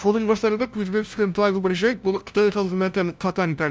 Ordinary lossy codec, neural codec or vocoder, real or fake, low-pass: none; codec, 16 kHz, 0.5 kbps, FunCodec, trained on LibriTTS, 25 frames a second; fake; none